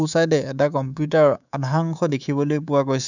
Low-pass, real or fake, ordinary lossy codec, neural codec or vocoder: 7.2 kHz; fake; none; codec, 24 kHz, 3.1 kbps, DualCodec